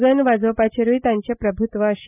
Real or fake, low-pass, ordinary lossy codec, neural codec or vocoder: real; 3.6 kHz; none; none